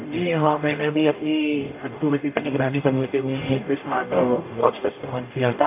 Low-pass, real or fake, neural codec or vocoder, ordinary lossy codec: 3.6 kHz; fake; codec, 44.1 kHz, 0.9 kbps, DAC; AAC, 24 kbps